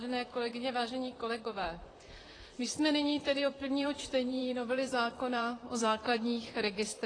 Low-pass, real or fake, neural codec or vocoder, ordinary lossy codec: 9.9 kHz; fake; vocoder, 44.1 kHz, 128 mel bands, Pupu-Vocoder; AAC, 32 kbps